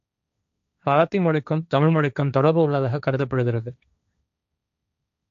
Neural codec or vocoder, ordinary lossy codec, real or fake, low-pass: codec, 16 kHz, 1.1 kbps, Voila-Tokenizer; none; fake; 7.2 kHz